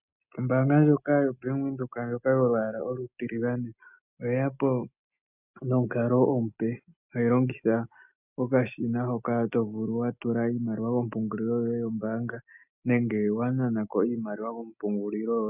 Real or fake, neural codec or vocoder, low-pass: real; none; 3.6 kHz